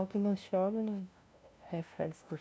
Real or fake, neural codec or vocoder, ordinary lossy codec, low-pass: fake; codec, 16 kHz, 0.5 kbps, FunCodec, trained on LibriTTS, 25 frames a second; none; none